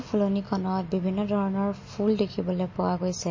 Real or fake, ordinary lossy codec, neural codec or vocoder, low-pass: real; MP3, 32 kbps; none; 7.2 kHz